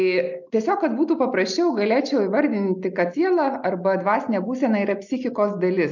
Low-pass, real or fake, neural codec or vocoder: 7.2 kHz; real; none